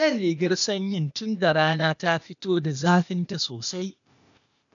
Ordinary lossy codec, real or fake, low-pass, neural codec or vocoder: none; fake; 7.2 kHz; codec, 16 kHz, 0.8 kbps, ZipCodec